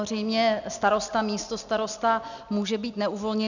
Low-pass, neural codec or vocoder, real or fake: 7.2 kHz; none; real